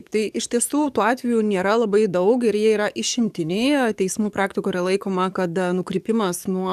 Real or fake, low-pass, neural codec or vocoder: fake; 14.4 kHz; codec, 44.1 kHz, 7.8 kbps, DAC